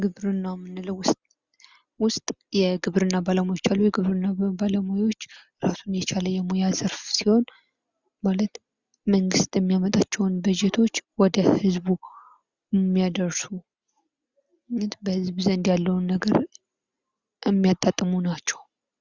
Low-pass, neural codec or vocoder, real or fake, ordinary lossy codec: 7.2 kHz; none; real; Opus, 64 kbps